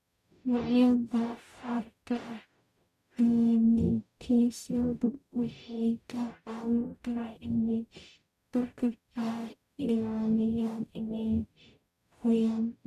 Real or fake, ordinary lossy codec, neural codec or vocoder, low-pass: fake; none; codec, 44.1 kHz, 0.9 kbps, DAC; 14.4 kHz